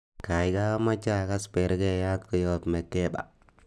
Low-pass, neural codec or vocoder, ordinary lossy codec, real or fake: none; none; none; real